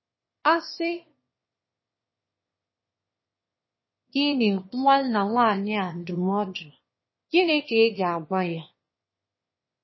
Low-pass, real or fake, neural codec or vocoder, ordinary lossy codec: 7.2 kHz; fake; autoencoder, 22.05 kHz, a latent of 192 numbers a frame, VITS, trained on one speaker; MP3, 24 kbps